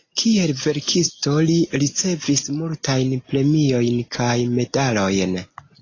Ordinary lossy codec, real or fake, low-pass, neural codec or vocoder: AAC, 32 kbps; real; 7.2 kHz; none